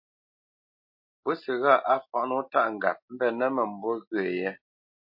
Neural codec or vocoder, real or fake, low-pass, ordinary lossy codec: none; real; 5.4 kHz; MP3, 32 kbps